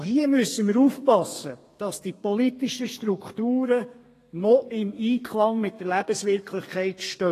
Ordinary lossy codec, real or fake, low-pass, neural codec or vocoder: AAC, 48 kbps; fake; 14.4 kHz; codec, 32 kHz, 1.9 kbps, SNAC